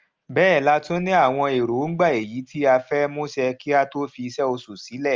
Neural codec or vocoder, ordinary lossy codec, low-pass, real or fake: none; Opus, 24 kbps; 7.2 kHz; real